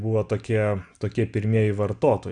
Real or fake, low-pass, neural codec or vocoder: real; 9.9 kHz; none